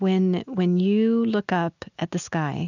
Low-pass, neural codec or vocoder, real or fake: 7.2 kHz; none; real